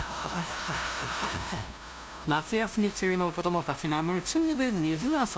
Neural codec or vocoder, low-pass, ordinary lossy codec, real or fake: codec, 16 kHz, 0.5 kbps, FunCodec, trained on LibriTTS, 25 frames a second; none; none; fake